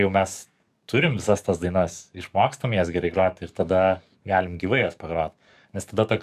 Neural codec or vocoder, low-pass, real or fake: codec, 44.1 kHz, 7.8 kbps, DAC; 14.4 kHz; fake